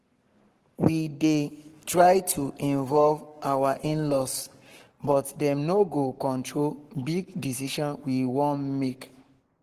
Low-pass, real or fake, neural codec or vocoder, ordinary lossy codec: 14.4 kHz; fake; codec, 44.1 kHz, 7.8 kbps, Pupu-Codec; Opus, 16 kbps